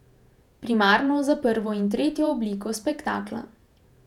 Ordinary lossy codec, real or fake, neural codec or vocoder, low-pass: none; fake; vocoder, 48 kHz, 128 mel bands, Vocos; 19.8 kHz